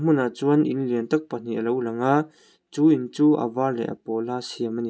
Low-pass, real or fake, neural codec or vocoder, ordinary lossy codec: none; real; none; none